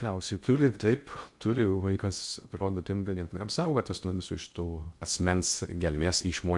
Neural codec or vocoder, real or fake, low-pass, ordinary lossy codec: codec, 16 kHz in and 24 kHz out, 0.6 kbps, FocalCodec, streaming, 2048 codes; fake; 10.8 kHz; MP3, 64 kbps